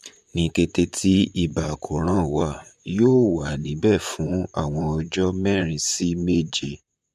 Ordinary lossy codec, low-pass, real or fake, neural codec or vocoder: MP3, 96 kbps; 14.4 kHz; fake; vocoder, 44.1 kHz, 128 mel bands, Pupu-Vocoder